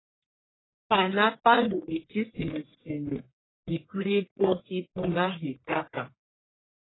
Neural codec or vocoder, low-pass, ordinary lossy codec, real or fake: codec, 44.1 kHz, 1.7 kbps, Pupu-Codec; 7.2 kHz; AAC, 16 kbps; fake